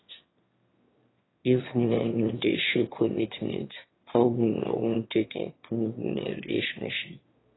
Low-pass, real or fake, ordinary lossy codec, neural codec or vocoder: 7.2 kHz; fake; AAC, 16 kbps; autoencoder, 22.05 kHz, a latent of 192 numbers a frame, VITS, trained on one speaker